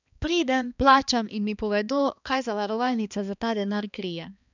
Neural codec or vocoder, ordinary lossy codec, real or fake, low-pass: codec, 16 kHz, 2 kbps, X-Codec, HuBERT features, trained on balanced general audio; none; fake; 7.2 kHz